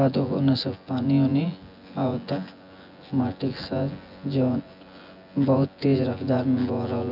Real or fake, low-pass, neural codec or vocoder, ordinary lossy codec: fake; 5.4 kHz; vocoder, 24 kHz, 100 mel bands, Vocos; none